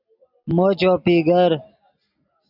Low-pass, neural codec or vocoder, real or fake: 5.4 kHz; none; real